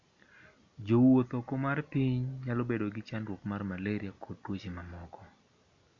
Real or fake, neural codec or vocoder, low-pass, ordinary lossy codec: real; none; 7.2 kHz; none